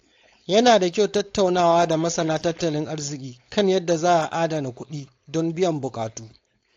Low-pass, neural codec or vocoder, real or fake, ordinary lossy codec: 7.2 kHz; codec, 16 kHz, 4.8 kbps, FACodec; fake; AAC, 48 kbps